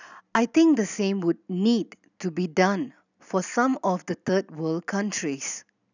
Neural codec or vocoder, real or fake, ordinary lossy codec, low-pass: none; real; none; 7.2 kHz